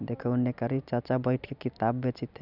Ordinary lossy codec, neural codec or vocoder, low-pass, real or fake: none; none; 5.4 kHz; real